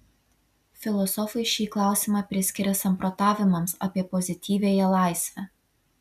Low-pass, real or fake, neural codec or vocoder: 14.4 kHz; real; none